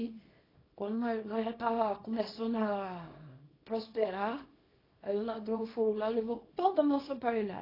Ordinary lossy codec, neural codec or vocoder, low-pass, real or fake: AAC, 24 kbps; codec, 24 kHz, 0.9 kbps, WavTokenizer, small release; 5.4 kHz; fake